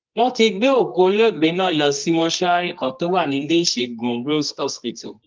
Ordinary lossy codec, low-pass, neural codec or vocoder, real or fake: Opus, 32 kbps; 7.2 kHz; codec, 24 kHz, 0.9 kbps, WavTokenizer, medium music audio release; fake